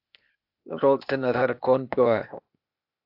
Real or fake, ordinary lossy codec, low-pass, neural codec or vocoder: fake; AAC, 48 kbps; 5.4 kHz; codec, 16 kHz, 0.8 kbps, ZipCodec